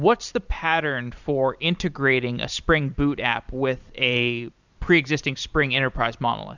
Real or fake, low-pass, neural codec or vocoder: fake; 7.2 kHz; vocoder, 44.1 kHz, 128 mel bands every 512 samples, BigVGAN v2